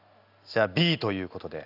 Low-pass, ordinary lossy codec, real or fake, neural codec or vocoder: 5.4 kHz; none; real; none